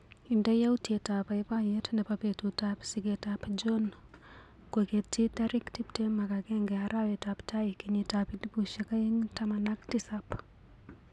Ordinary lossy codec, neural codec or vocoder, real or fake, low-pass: none; none; real; none